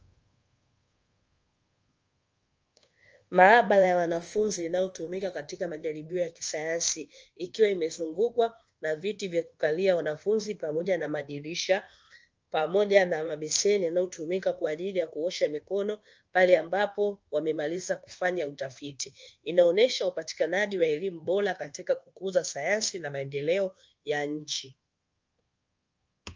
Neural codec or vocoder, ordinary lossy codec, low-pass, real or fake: codec, 24 kHz, 1.2 kbps, DualCodec; Opus, 24 kbps; 7.2 kHz; fake